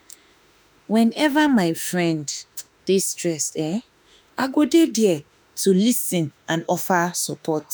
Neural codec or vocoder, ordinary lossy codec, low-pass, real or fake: autoencoder, 48 kHz, 32 numbers a frame, DAC-VAE, trained on Japanese speech; none; none; fake